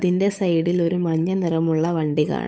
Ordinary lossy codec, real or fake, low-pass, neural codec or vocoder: none; fake; none; codec, 16 kHz, 8 kbps, FunCodec, trained on Chinese and English, 25 frames a second